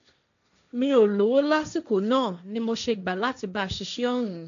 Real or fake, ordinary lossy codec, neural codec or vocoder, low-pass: fake; none; codec, 16 kHz, 1.1 kbps, Voila-Tokenizer; 7.2 kHz